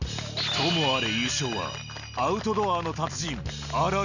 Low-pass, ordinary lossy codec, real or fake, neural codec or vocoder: 7.2 kHz; none; real; none